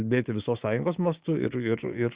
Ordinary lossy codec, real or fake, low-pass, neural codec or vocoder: Opus, 64 kbps; fake; 3.6 kHz; codec, 16 kHz, 6 kbps, DAC